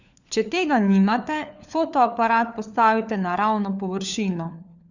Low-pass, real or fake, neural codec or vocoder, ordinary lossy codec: 7.2 kHz; fake; codec, 16 kHz, 4 kbps, FunCodec, trained on LibriTTS, 50 frames a second; none